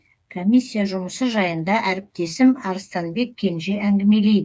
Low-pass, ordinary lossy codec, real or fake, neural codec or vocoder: none; none; fake; codec, 16 kHz, 4 kbps, FreqCodec, smaller model